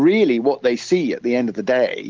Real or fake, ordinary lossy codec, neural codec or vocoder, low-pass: real; Opus, 16 kbps; none; 7.2 kHz